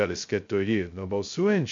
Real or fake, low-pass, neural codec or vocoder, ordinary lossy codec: fake; 7.2 kHz; codec, 16 kHz, 0.2 kbps, FocalCodec; MP3, 48 kbps